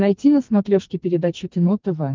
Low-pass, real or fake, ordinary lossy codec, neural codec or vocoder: 7.2 kHz; fake; Opus, 32 kbps; codec, 44.1 kHz, 2.6 kbps, SNAC